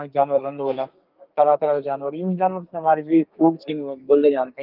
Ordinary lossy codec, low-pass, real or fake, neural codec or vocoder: Opus, 24 kbps; 5.4 kHz; fake; codec, 32 kHz, 1.9 kbps, SNAC